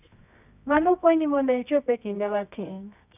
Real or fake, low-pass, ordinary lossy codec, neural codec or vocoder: fake; 3.6 kHz; none; codec, 24 kHz, 0.9 kbps, WavTokenizer, medium music audio release